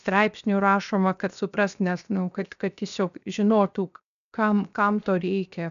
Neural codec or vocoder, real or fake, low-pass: codec, 16 kHz, 0.7 kbps, FocalCodec; fake; 7.2 kHz